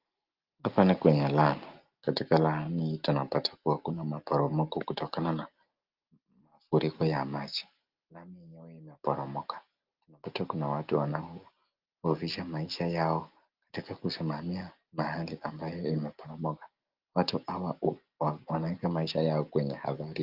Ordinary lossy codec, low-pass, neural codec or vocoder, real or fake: Opus, 32 kbps; 5.4 kHz; none; real